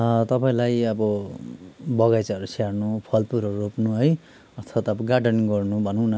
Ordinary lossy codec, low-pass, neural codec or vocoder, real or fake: none; none; none; real